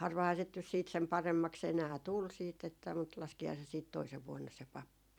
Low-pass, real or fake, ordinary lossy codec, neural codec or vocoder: 19.8 kHz; fake; none; vocoder, 44.1 kHz, 128 mel bands every 256 samples, BigVGAN v2